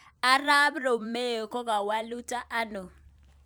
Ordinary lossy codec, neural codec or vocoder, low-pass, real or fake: none; vocoder, 44.1 kHz, 128 mel bands every 512 samples, BigVGAN v2; none; fake